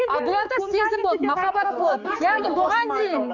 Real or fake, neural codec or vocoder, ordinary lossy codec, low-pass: fake; codec, 16 kHz, 4 kbps, X-Codec, HuBERT features, trained on general audio; none; 7.2 kHz